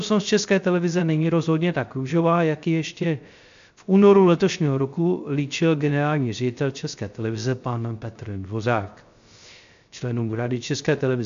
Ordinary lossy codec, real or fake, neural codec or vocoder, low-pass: MP3, 64 kbps; fake; codec, 16 kHz, 0.3 kbps, FocalCodec; 7.2 kHz